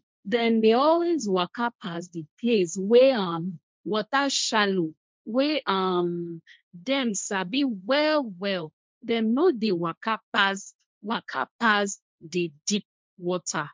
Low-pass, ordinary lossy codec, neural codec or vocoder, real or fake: none; none; codec, 16 kHz, 1.1 kbps, Voila-Tokenizer; fake